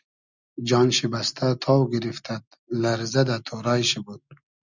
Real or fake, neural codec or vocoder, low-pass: real; none; 7.2 kHz